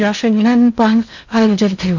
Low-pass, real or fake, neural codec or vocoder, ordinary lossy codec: 7.2 kHz; fake; codec, 16 kHz in and 24 kHz out, 0.8 kbps, FocalCodec, streaming, 65536 codes; none